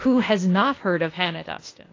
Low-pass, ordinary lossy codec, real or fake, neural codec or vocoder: 7.2 kHz; AAC, 32 kbps; fake; codec, 16 kHz, 0.8 kbps, ZipCodec